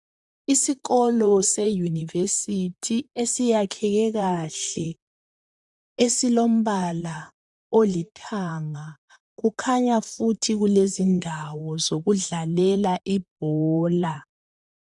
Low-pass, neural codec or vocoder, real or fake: 10.8 kHz; vocoder, 44.1 kHz, 128 mel bands, Pupu-Vocoder; fake